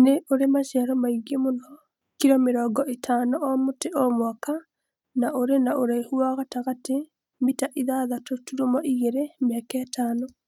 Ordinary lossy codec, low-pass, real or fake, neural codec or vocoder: none; 19.8 kHz; real; none